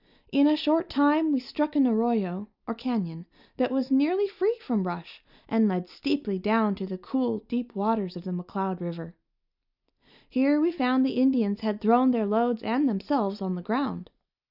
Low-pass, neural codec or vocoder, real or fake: 5.4 kHz; none; real